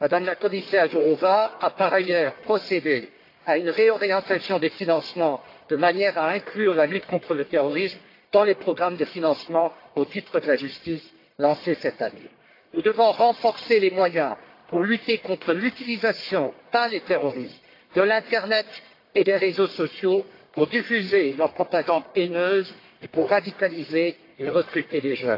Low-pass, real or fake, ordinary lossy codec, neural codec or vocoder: 5.4 kHz; fake; AAC, 32 kbps; codec, 44.1 kHz, 1.7 kbps, Pupu-Codec